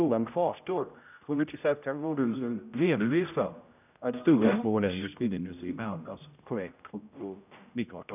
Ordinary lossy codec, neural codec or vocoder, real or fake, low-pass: none; codec, 16 kHz, 0.5 kbps, X-Codec, HuBERT features, trained on general audio; fake; 3.6 kHz